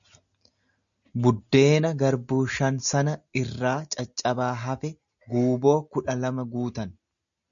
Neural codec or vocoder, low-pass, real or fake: none; 7.2 kHz; real